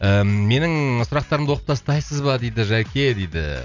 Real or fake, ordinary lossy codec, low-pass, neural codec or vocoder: real; none; 7.2 kHz; none